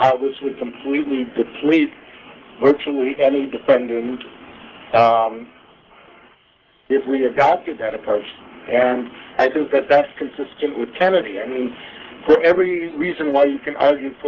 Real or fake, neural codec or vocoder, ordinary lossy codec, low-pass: fake; codec, 44.1 kHz, 3.4 kbps, Pupu-Codec; Opus, 16 kbps; 7.2 kHz